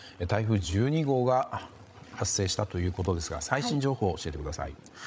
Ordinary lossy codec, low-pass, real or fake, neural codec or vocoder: none; none; fake; codec, 16 kHz, 16 kbps, FreqCodec, larger model